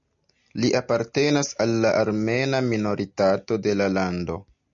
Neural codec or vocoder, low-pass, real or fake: none; 7.2 kHz; real